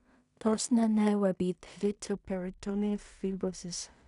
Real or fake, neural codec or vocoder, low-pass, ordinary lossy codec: fake; codec, 16 kHz in and 24 kHz out, 0.4 kbps, LongCat-Audio-Codec, two codebook decoder; 10.8 kHz; none